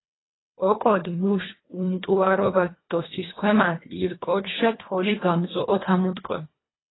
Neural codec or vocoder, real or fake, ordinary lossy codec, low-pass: codec, 24 kHz, 1.5 kbps, HILCodec; fake; AAC, 16 kbps; 7.2 kHz